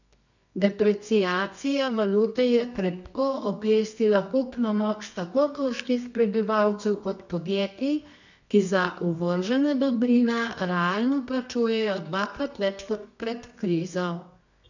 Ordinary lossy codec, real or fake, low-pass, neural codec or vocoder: none; fake; 7.2 kHz; codec, 24 kHz, 0.9 kbps, WavTokenizer, medium music audio release